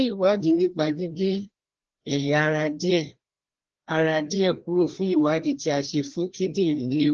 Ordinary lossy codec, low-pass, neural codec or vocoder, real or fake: Opus, 16 kbps; 7.2 kHz; codec, 16 kHz, 1 kbps, FreqCodec, larger model; fake